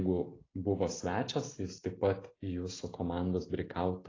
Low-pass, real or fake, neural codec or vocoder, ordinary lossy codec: 7.2 kHz; real; none; AAC, 32 kbps